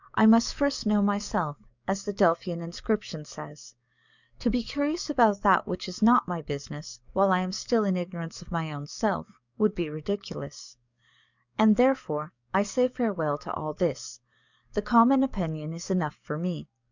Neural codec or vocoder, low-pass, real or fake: codec, 44.1 kHz, 7.8 kbps, DAC; 7.2 kHz; fake